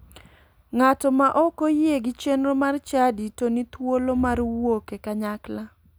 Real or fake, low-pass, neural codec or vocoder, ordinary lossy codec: real; none; none; none